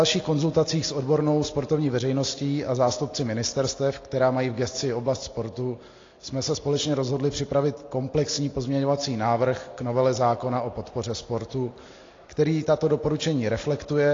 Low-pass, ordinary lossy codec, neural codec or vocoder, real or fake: 7.2 kHz; AAC, 32 kbps; none; real